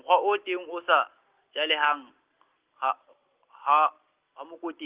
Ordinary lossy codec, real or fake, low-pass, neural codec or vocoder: Opus, 16 kbps; real; 3.6 kHz; none